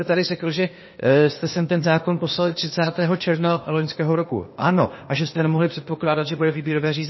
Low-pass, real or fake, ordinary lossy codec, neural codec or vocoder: 7.2 kHz; fake; MP3, 24 kbps; codec, 16 kHz, 0.8 kbps, ZipCodec